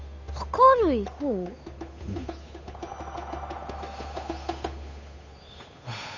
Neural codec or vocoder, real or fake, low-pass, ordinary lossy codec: codec, 16 kHz, 8 kbps, FunCodec, trained on Chinese and English, 25 frames a second; fake; 7.2 kHz; none